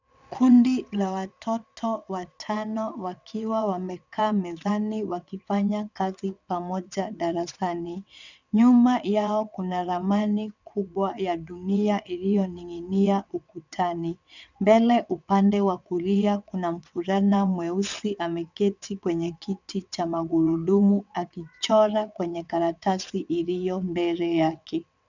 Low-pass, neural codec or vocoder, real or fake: 7.2 kHz; vocoder, 22.05 kHz, 80 mel bands, WaveNeXt; fake